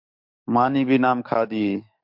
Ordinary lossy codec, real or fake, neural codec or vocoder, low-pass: MP3, 48 kbps; fake; codec, 44.1 kHz, 7.8 kbps, DAC; 5.4 kHz